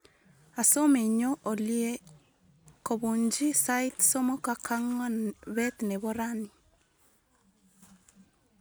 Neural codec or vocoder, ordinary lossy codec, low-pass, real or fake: none; none; none; real